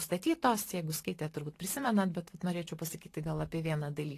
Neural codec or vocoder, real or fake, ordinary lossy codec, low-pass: vocoder, 44.1 kHz, 128 mel bands every 512 samples, BigVGAN v2; fake; AAC, 48 kbps; 14.4 kHz